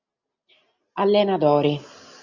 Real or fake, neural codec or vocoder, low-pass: real; none; 7.2 kHz